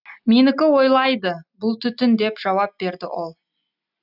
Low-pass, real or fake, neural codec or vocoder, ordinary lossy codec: 5.4 kHz; real; none; none